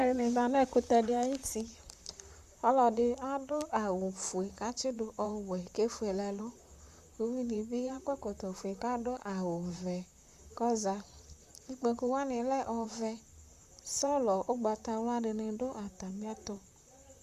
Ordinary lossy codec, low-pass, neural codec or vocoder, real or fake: Opus, 64 kbps; 14.4 kHz; vocoder, 44.1 kHz, 128 mel bands, Pupu-Vocoder; fake